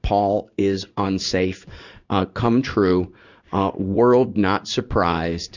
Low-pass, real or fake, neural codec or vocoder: 7.2 kHz; fake; codec, 16 kHz in and 24 kHz out, 1 kbps, XY-Tokenizer